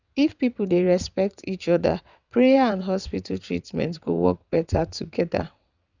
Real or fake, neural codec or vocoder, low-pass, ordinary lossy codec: fake; vocoder, 22.05 kHz, 80 mel bands, WaveNeXt; 7.2 kHz; none